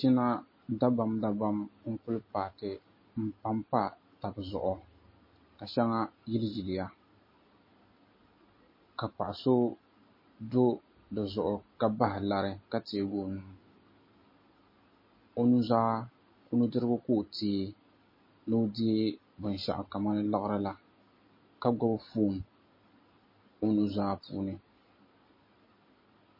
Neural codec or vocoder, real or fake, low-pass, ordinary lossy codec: none; real; 5.4 kHz; MP3, 24 kbps